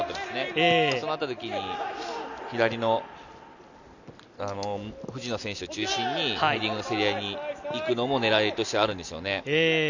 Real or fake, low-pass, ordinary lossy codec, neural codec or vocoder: real; 7.2 kHz; none; none